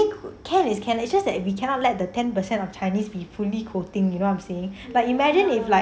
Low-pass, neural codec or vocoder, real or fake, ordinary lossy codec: none; none; real; none